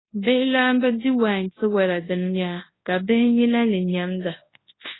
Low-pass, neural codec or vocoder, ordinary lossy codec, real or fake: 7.2 kHz; codec, 24 kHz, 0.9 kbps, WavTokenizer, large speech release; AAC, 16 kbps; fake